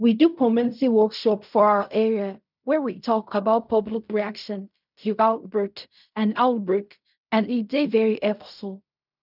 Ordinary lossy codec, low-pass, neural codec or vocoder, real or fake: none; 5.4 kHz; codec, 16 kHz in and 24 kHz out, 0.4 kbps, LongCat-Audio-Codec, fine tuned four codebook decoder; fake